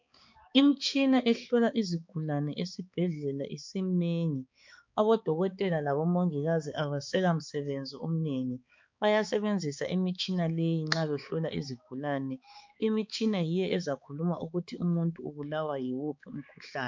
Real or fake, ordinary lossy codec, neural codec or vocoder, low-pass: fake; MP3, 64 kbps; codec, 16 kHz, 4 kbps, X-Codec, HuBERT features, trained on balanced general audio; 7.2 kHz